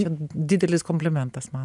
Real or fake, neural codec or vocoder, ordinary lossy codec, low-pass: real; none; MP3, 96 kbps; 10.8 kHz